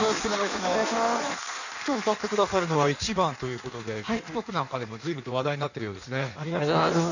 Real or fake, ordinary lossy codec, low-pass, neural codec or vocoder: fake; none; 7.2 kHz; codec, 16 kHz in and 24 kHz out, 1.1 kbps, FireRedTTS-2 codec